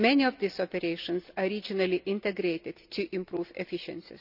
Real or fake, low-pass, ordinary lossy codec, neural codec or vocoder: real; 5.4 kHz; none; none